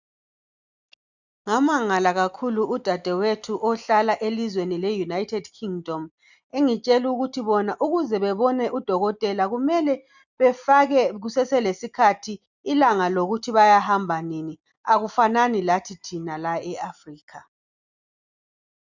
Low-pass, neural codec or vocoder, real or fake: 7.2 kHz; none; real